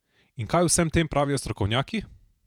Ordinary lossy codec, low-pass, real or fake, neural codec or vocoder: none; 19.8 kHz; fake; vocoder, 48 kHz, 128 mel bands, Vocos